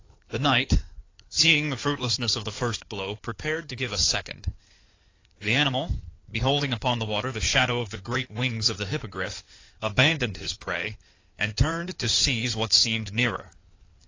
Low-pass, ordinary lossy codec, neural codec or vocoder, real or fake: 7.2 kHz; AAC, 32 kbps; codec, 16 kHz in and 24 kHz out, 2.2 kbps, FireRedTTS-2 codec; fake